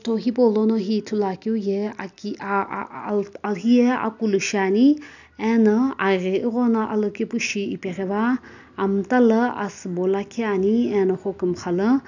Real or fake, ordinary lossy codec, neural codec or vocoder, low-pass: real; none; none; 7.2 kHz